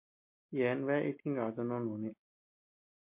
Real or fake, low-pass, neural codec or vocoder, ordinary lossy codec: real; 3.6 kHz; none; MP3, 24 kbps